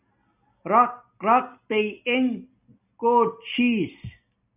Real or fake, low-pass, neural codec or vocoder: real; 3.6 kHz; none